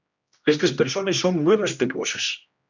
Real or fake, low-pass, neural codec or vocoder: fake; 7.2 kHz; codec, 16 kHz, 1 kbps, X-Codec, HuBERT features, trained on general audio